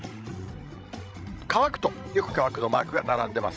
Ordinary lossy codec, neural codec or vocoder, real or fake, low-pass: none; codec, 16 kHz, 16 kbps, FreqCodec, larger model; fake; none